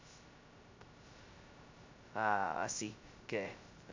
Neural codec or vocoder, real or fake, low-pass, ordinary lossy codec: codec, 16 kHz, 0.2 kbps, FocalCodec; fake; 7.2 kHz; MP3, 64 kbps